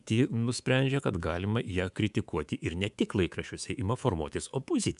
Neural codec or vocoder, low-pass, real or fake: codec, 24 kHz, 3.1 kbps, DualCodec; 10.8 kHz; fake